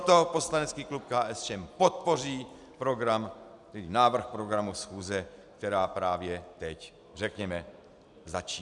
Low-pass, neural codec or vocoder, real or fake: 10.8 kHz; none; real